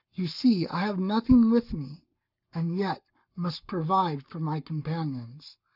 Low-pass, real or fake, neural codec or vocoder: 5.4 kHz; fake; codec, 44.1 kHz, 7.8 kbps, Pupu-Codec